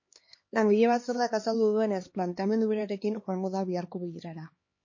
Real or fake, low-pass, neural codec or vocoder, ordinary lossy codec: fake; 7.2 kHz; codec, 16 kHz, 2 kbps, X-Codec, HuBERT features, trained on LibriSpeech; MP3, 32 kbps